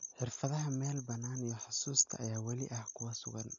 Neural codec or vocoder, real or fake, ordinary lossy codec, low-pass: none; real; none; 7.2 kHz